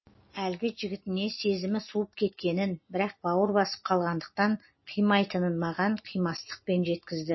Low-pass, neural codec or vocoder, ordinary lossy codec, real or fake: 7.2 kHz; none; MP3, 24 kbps; real